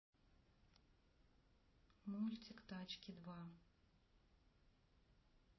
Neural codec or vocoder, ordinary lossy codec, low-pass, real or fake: none; MP3, 24 kbps; 7.2 kHz; real